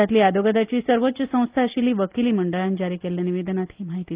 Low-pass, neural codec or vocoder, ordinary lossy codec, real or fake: 3.6 kHz; none; Opus, 32 kbps; real